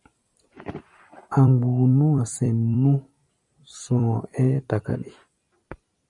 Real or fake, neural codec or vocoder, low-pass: fake; vocoder, 44.1 kHz, 128 mel bands every 256 samples, BigVGAN v2; 10.8 kHz